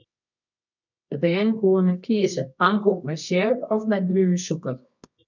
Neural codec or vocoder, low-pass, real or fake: codec, 24 kHz, 0.9 kbps, WavTokenizer, medium music audio release; 7.2 kHz; fake